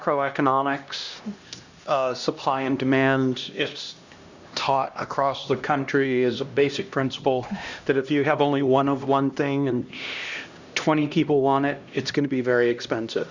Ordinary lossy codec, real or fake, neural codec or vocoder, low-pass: Opus, 64 kbps; fake; codec, 16 kHz, 1 kbps, X-Codec, HuBERT features, trained on LibriSpeech; 7.2 kHz